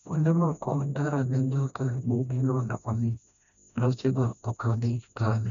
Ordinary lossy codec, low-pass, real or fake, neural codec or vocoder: none; 7.2 kHz; fake; codec, 16 kHz, 1 kbps, FreqCodec, smaller model